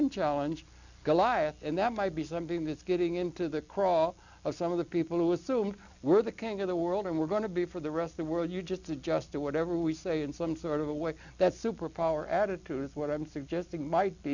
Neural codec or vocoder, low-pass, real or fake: none; 7.2 kHz; real